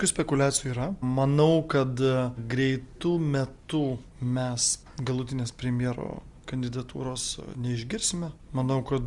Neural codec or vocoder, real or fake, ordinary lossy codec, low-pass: none; real; Opus, 64 kbps; 10.8 kHz